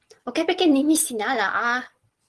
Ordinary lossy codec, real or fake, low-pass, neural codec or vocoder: Opus, 16 kbps; real; 10.8 kHz; none